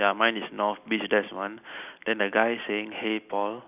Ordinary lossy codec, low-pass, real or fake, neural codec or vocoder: none; 3.6 kHz; real; none